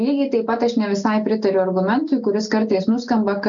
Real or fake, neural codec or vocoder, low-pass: real; none; 7.2 kHz